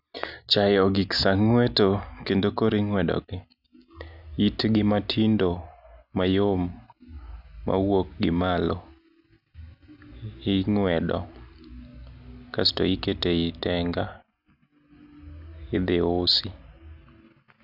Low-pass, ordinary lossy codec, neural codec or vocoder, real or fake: 5.4 kHz; none; none; real